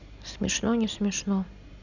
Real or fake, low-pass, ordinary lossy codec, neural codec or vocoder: real; 7.2 kHz; none; none